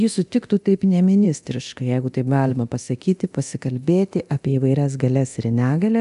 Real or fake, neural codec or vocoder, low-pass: fake; codec, 24 kHz, 0.9 kbps, DualCodec; 10.8 kHz